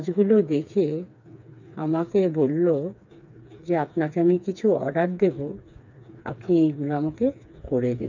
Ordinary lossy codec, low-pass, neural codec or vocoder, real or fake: none; 7.2 kHz; codec, 16 kHz, 4 kbps, FreqCodec, smaller model; fake